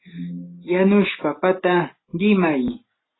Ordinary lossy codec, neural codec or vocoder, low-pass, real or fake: AAC, 16 kbps; none; 7.2 kHz; real